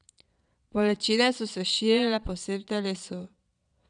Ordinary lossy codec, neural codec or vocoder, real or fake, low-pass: none; vocoder, 22.05 kHz, 80 mel bands, Vocos; fake; 9.9 kHz